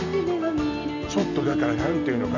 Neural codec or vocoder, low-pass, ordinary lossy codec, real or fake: none; 7.2 kHz; none; real